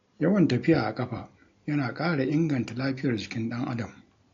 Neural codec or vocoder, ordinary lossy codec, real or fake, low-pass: none; AAC, 48 kbps; real; 7.2 kHz